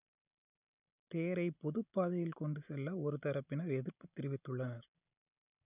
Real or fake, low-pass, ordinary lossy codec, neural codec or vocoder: real; 3.6 kHz; none; none